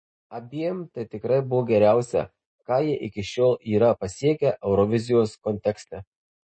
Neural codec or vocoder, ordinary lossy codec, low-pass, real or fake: none; MP3, 32 kbps; 9.9 kHz; real